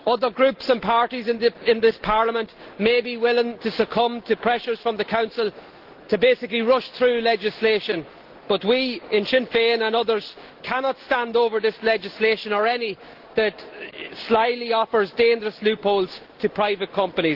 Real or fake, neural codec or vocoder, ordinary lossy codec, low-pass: real; none; Opus, 16 kbps; 5.4 kHz